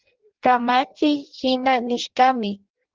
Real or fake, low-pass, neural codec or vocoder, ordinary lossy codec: fake; 7.2 kHz; codec, 16 kHz in and 24 kHz out, 0.6 kbps, FireRedTTS-2 codec; Opus, 16 kbps